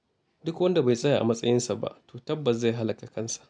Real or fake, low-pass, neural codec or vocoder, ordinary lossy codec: real; 9.9 kHz; none; none